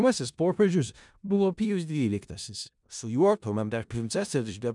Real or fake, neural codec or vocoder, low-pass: fake; codec, 16 kHz in and 24 kHz out, 0.4 kbps, LongCat-Audio-Codec, four codebook decoder; 10.8 kHz